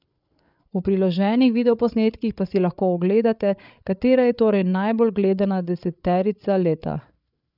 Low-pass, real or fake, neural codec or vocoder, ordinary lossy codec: 5.4 kHz; fake; codec, 16 kHz, 8 kbps, FreqCodec, larger model; none